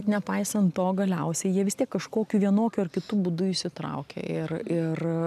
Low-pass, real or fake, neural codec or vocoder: 14.4 kHz; real; none